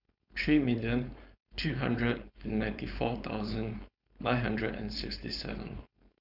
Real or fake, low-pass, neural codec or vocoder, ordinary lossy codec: fake; 5.4 kHz; codec, 16 kHz, 4.8 kbps, FACodec; none